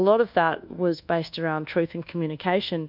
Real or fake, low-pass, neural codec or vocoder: fake; 5.4 kHz; codec, 24 kHz, 1.2 kbps, DualCodec